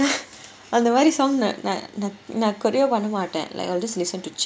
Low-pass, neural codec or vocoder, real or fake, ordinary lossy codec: none; none; real; none